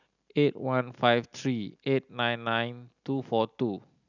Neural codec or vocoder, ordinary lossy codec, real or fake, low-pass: none; none; real; 7.2 kHz